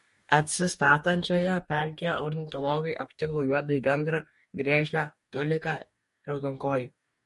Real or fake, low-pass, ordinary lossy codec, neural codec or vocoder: fake; 14.4 kHz; MP3, 48 kbps; codec, 44.1 kHz, 2.6 kbps, DAC